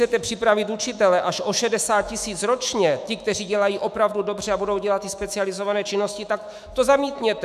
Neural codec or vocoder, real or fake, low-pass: autoencoder, 48 kHz, 128 numbers a frame, DAC-VAE, trained on Japanese speech; fake; 14.4 kHz